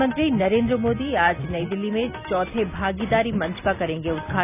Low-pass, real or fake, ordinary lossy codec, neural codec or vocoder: 3.6 kHz; real; none; none